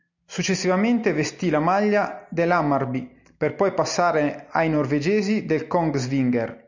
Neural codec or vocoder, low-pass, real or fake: none; 7.2 kHz; real